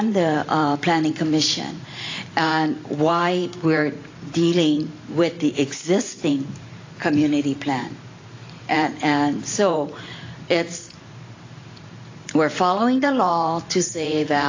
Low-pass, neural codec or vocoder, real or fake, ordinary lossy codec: 7.2 kHz; vocoder, 44.1 kHz, 128 mel bands every 512 samples, BigVGAN v2; fake; AAC, 32 kbps